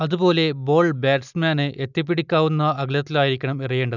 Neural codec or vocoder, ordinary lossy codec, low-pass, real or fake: none; none; 7.2 kHz; real